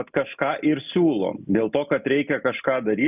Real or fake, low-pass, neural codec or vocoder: real; 3.6 kHz; none